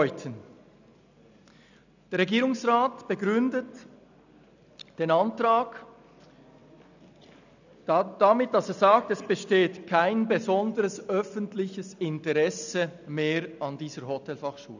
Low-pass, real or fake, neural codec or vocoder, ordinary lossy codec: 7.2 kHz; real; none; none